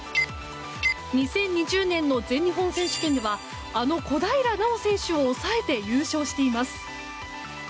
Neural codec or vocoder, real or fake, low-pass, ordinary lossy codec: none; real; none; none